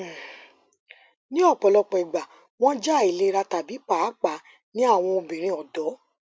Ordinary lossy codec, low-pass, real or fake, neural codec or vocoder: none; none; real; none